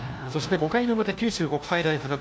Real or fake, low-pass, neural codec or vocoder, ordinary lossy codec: fake; none; codec, 16 kHz, 1 kbps, FunCodec, trained on LibriTTS, 50 frames a second; none